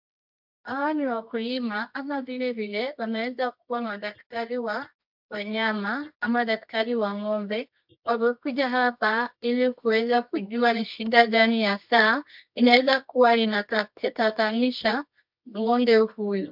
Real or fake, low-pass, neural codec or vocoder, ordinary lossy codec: fake; 5.4 kHz; codec, 24 kHz, 0.9 kbps, WavTokenizer, medium music audio release; MP3, 48 kbps